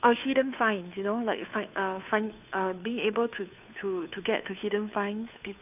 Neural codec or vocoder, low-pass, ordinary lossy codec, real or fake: codec, 16 kHz, 2 kbps, FunCodec, trained on Chinese and English, 25 frames a second; 3.6 kHz; none; fake